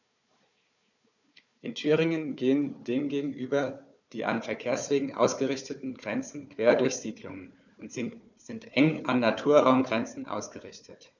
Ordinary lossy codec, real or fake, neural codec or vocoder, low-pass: none; fake; codec, 16 kHz, 4 kbps, FunCodec, trained on Chinese and English, 50 frames a second; 7.2 kHz